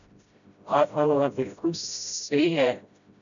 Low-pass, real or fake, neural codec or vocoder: 7.2 kHz; fake; codec, 16 kHz, 0.5 kbps, FreqCodec, smaller model